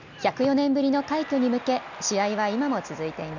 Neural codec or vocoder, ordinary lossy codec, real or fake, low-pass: none; none; real; 7.2 kHz